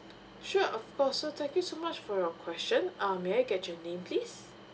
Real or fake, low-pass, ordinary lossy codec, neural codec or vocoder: real; none; none; none